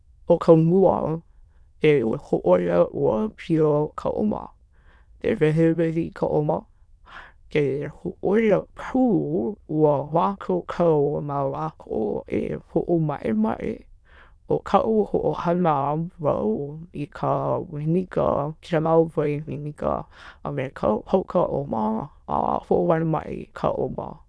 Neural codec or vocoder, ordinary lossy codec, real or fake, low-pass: autoencoder, 22.05 kHz, a latent of 192 numbers a frame, VITS, trained on many speakers; none; fake; none